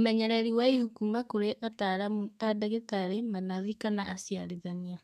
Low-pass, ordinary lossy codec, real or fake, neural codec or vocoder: 14.4 kHz; none; fake; codec, 32 kHz, 1.9 kbps, SNAC